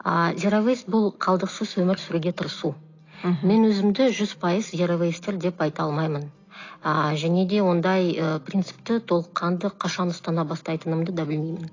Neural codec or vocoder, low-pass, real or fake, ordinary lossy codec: none; 7.2 kHz; real; AAC, 32 kbps